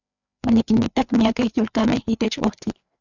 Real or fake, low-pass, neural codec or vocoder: fake; 7.2 kHz; codec, 16 kHz, 4 kbps, FreqCodec, larger model